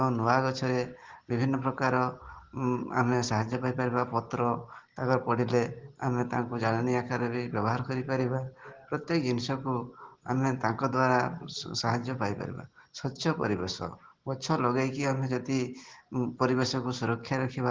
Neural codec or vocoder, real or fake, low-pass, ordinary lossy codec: none; real; 7.2 kHz; Opus, 16 kbps